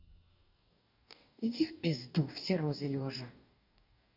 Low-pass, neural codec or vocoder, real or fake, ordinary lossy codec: 5.4 kHz; codec, 44.1 kHz, 2.6 kbps, DAC; fake; none